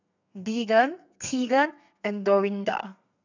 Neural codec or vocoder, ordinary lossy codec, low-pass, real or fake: codec, 32 kHz, 1.9 kbps, SNAC; none; 7.2 kHz; fake